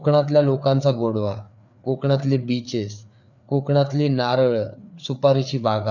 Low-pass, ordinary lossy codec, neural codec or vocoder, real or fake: 7.2 kHz; none; codec, 16 kHz, 4 kbps, FreqCodec, larger model; fake